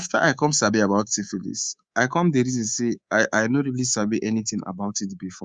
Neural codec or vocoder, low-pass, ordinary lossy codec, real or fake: codec, 24 kHz, 3.1 kbps, DualCodec; 9.9 kHz; Opus, 64 kbps; fake